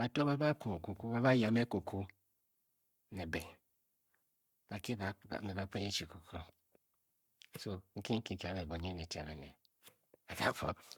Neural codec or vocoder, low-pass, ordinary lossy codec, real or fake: codec, 44.1 kHz, 7.8 kbps, Pupu-Codec; 19.8 kHz; Opus, 64 kbps; fake